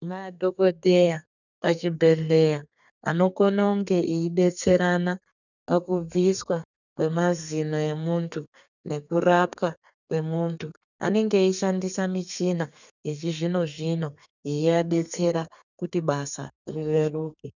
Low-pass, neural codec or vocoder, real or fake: 7.2 kHz; codec, 32 kHz, 1.9 kbps, SNAC; fake